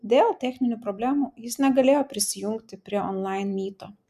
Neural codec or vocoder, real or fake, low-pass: none; real; 14.4 kHz